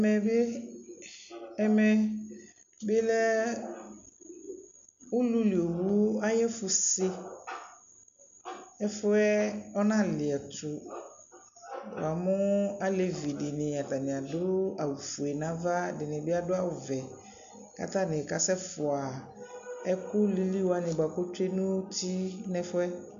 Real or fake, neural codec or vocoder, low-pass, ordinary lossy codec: real; none; 7.2 kHz; MP3, 64 kbps